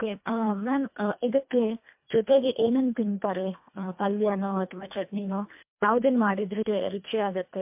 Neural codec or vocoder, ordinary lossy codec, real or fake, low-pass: codec, 24 kHz, 1.5 kbps, HILCodec; MP3, 32 kbps; fake; 3.6 kHz